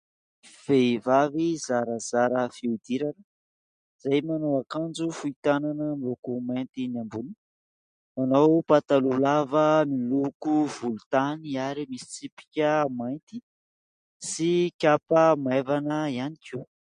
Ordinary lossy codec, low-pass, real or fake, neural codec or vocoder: MP3, 48 kbps; 9.9 kHz; real; none